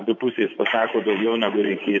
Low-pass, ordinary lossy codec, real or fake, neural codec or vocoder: 7.2 kHz; MP3, 64 kbps; fake; vocoder, 24 kHz, 100 mel bands, Vocos